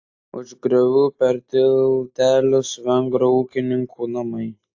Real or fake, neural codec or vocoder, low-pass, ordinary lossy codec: real; none; 7.2 kHz; AAC, 48 kbps